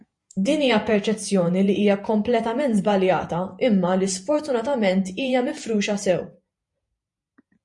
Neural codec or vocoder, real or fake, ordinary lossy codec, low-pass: vocoder, 48 kHz, 128 mel bands, Vocos; fake; MP3, 48 kbps; 10.8 kHz